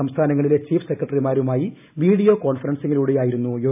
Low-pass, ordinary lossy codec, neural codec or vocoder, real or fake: 3.6 kHz; none; none; real